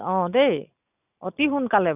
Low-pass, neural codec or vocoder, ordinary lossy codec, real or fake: 3.6 kHz; none; none; real